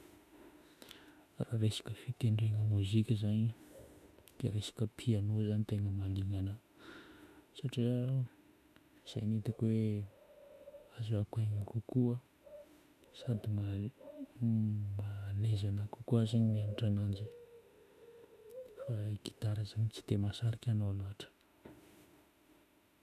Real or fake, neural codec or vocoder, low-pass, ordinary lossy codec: fake; autoencoder, 48 kHz, 32 numbers a frame, DAC-VAE, trained on Japanese speech; 14.4 kHz; none